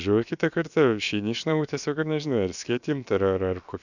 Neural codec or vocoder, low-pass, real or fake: codec, 16 kHz, about 1 kbps, DyCAST, with the encoder's durations; 7.2 kHz; fake